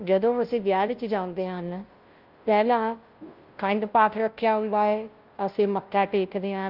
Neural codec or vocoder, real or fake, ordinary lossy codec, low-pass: codec, 16 kHz, 0.5 kbps, FunCodec, trained on Chinese and English, 25 frames a second; fake; Opus, 32 kbps; 5.4 kHz